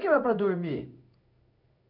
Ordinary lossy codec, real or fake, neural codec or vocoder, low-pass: none; fake; codec, 16 kHz in and 24 kHz out, 1 kbps, XY-Tokenizer; 5.4 kHz